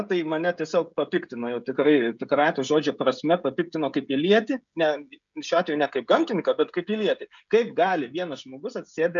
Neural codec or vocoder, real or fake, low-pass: codec, 16 kHz, 16 kbps, FreqCodec, smaller model; fake; 7.2 kHz